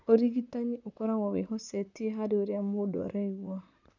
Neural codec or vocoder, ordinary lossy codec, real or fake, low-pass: vocoder, 44.1 kHz, 128 mel bands, Pupu-Vocoder; none; fake; 7.2 kHz